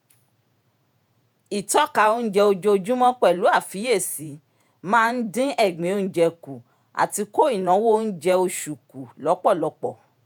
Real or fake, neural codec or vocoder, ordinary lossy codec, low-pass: fake; vocoder, 48 kHz, 128 mel bands, Vocos; none; none